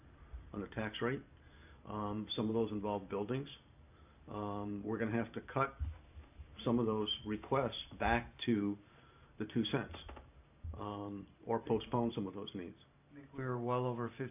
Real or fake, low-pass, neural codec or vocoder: fake; 3.6 kHz; vocoder, 44.1 kHz, 128 mel bands every 512 samples, BigVGAN v2